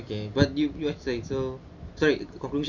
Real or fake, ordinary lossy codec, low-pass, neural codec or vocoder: real; none; 7.2 kHz; none